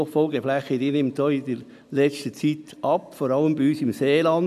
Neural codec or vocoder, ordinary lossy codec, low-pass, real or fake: none; none; 14.4 kHz; real